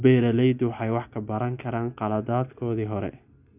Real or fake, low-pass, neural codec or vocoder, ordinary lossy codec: real; 3.6 kHz; none; none